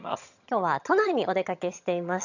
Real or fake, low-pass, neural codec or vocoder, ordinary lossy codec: fake; 7.2 kHz; vocoder, 22.05 kHz, 80 mel bands, HiFi-GAN; none